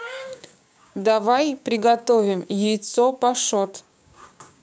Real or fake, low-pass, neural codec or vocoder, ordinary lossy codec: fake; none; codec, 16 kHz, 6 kbps, DAC; none